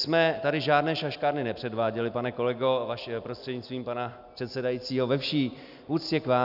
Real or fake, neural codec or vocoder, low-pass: real; none; 5.4 kHz